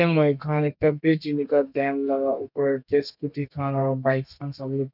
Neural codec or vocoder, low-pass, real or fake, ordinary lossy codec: codec, 32 kHz, 1.9 kbps, SNAC; 5.4 kHz; fake; none